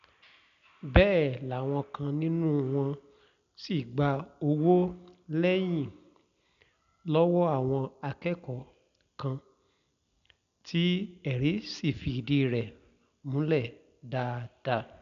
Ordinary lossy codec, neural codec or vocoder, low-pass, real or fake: none; none; 7.2 kHz; real